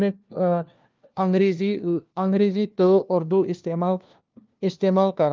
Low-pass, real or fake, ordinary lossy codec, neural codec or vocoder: 7.2 kHz; fake; Opus, 24 kbps; codec, 16 kHz, 1 kbps, FunCodec, trained on LibriTTS, 50 frames a second